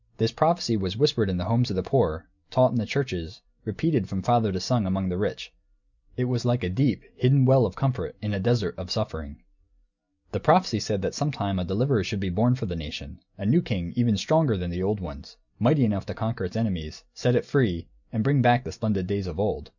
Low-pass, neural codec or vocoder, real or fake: 7.2 kHz; none; real